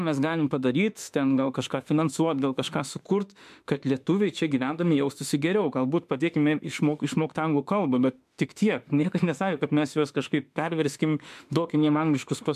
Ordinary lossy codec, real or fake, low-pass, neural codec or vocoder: MP3, 96 kbps; fake; 14.4 kHz; autoencoder, 48 kHz, 32 numbers a frame, DAC-VAE, trained on Japanese speech